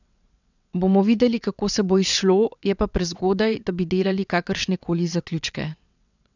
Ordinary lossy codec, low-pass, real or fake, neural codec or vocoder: none; 7.2 kHz; fake; vocoder, 22.05 kHz, 80 mel bands, WaveNeXt